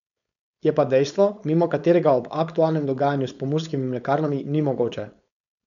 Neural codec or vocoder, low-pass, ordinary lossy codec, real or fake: codec, 16 kHz, 4.8 kbps, FACodec; 7.2 kHz; none; fake